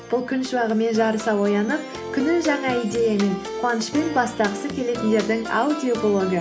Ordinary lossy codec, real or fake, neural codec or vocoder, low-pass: none; real; none; none